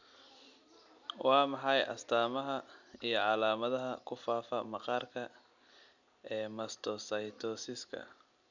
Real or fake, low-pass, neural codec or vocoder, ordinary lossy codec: real; 7.2 kHz; none; none